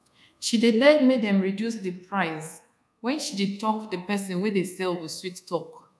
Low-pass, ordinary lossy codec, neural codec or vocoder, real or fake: none; none; codec, 24 kHz, 1.2 kbps, DualCodec; fake